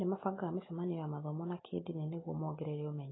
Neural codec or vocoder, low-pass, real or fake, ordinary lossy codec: none; 7.2 kHz; real; AAC, 16 kbps